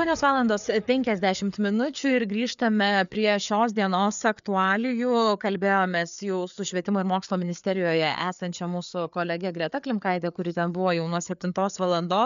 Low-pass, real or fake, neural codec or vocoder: 7.2 kHz; fake; codec, 16 kHz, 4 kbps, FreqCodec, larger model